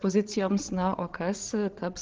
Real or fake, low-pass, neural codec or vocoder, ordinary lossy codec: fake; 7.2 kHz; codec, 16 kHz, 16 kbps, FreqCodec, larger model; Opus, 16 kbps